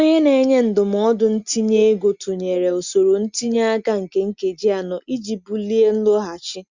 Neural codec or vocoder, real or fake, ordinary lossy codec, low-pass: none; real; Opus, 64 kbps; 7.2 kHz